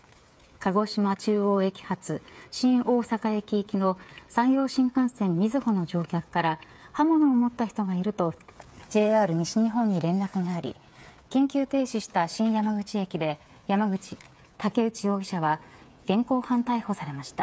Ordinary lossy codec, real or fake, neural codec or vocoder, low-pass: none; fake; codec, 16 kHz, 8 kbps, FreqCodec, smaller model; none